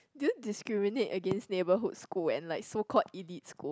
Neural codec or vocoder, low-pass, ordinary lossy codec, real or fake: none; none; none; real